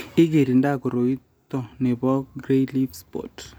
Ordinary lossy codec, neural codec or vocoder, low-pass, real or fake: none; none; none; real